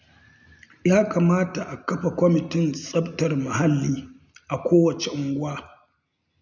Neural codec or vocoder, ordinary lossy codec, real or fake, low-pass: none; none; real; 7.2 kHz